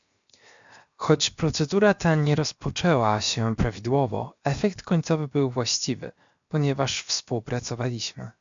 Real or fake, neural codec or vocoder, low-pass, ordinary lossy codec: fake; codec, 16 kHz, 0.7 kbps, FocalCodec; 7.2 kHz; MP3, 64 kbps